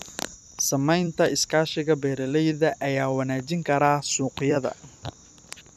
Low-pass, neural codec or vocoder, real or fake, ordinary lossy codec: 14.4 kHz; vocoder, 44.1 kHz, 128 mel bands every 256 samples, BigVGAN v2; fake; none